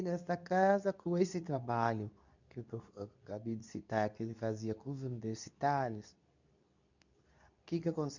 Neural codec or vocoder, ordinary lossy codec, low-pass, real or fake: codec, 24 kHz, 0.9 kbps, WavTokenizer, medium speech release version 2; none; 7.2 kHz; fake